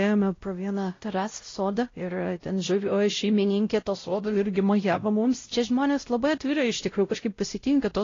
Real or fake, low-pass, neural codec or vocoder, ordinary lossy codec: fake; 7.2 kHz; codec, 16 kHz, 0.5 kbps, X-Codec, WavLM features, trained on Multilingual LibriSpeech; AAC, 32 kbps